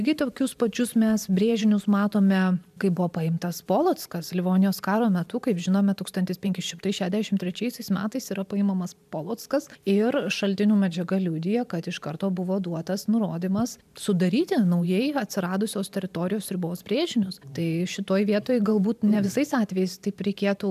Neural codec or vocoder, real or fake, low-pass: vocoder, 44.1 kHz, 128 mel bands every 512 samples, BigVGAN v2; fake; 14.4 kHz